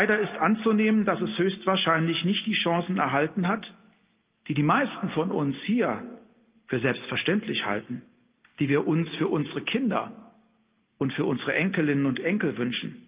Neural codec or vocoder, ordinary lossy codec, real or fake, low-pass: none; Opus, 24 kbps; real; 3.6 kHz